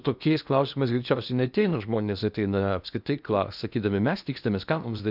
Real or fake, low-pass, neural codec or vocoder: fake; 5.4 kHz; codec, 16 kHz in and 24 kHz out, 0.8 kbps, FocalCodec, streaming, 65536 codes